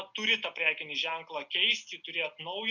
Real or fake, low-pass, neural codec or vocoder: real; 7.2 kHz; none